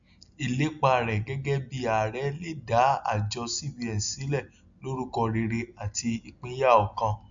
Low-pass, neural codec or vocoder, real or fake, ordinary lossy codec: 7.2 kHz; none; real; MP3, 64 kbps